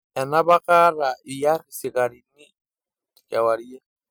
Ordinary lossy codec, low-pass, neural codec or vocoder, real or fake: none; none; none; real